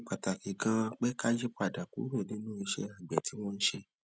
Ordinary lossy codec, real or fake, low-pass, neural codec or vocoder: none; real; none; none